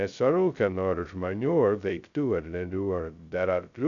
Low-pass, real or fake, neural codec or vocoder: 7.2 kHz; fake; codec, 16 kHz, 0.2 kbps, FocalCodec